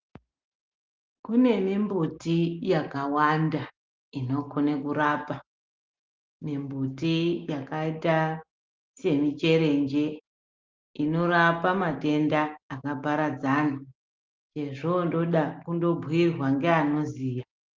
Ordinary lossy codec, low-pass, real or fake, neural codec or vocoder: Opus, 32 kbps; 7.2 kHz; real; none